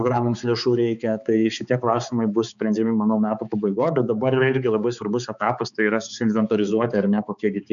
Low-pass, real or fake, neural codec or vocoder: 7.2 kHz; fake; codec, 16 kHz, 4 kbps, X-Codec, HuBERT features, trained on balanced general audio